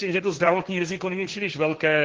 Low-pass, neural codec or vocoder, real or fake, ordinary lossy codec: 7.2 kHz; codec, 16 kHz, 1.1 kbps, Voila-Tokenizer; fake; Opus, 16 kbps